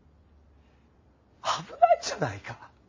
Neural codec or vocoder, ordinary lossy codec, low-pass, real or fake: none; MP3, 32 kbps; 7.2 kHz; real